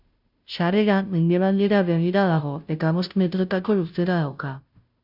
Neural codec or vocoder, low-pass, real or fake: codec, 16 kHz, 0.5 kbps, FunCodec, trained on Chinese and English, 25 frames a second; 5.4 kHz; fake